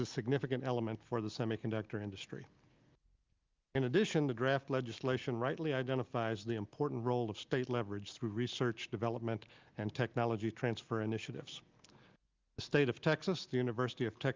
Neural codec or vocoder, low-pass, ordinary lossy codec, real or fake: none; 7.2 kHz; Opus, 24 kbps; real